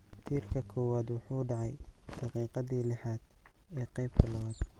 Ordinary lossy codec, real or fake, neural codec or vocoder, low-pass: Opus, 24 kbps; real; none; 19.8 kHz